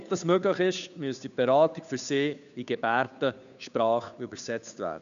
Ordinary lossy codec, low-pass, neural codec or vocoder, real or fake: none; 7.2 kHz; codec, 16 kHz, 2 kbps, FunCodec, trained on Chinese and English, 25 frames a second; fake